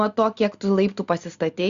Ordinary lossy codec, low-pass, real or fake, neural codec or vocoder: Opus, 64 kbps; 7.2 kHz; real; none